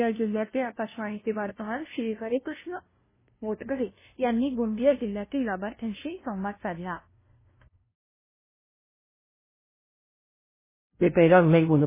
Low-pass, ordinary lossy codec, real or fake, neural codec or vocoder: 3.6 kHz; MP3, 16 kbps; fake; codec, 16 kHz, 0.5 kbps, FunCodec, trained on LibriTTS, 25 frames a second